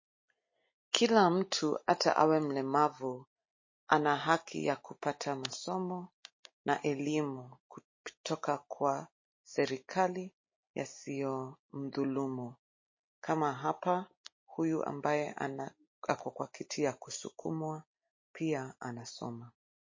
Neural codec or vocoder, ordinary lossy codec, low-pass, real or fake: none; MP3, 32 kbps; 7.2 kHz; real